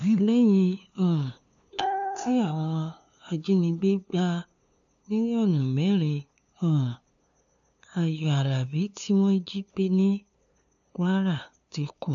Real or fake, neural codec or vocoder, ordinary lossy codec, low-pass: fake; codec, 16 kHz, 2 kbps, FunCodec, trained on LibriTTS, 25 frames a second; none; 7.2 kHz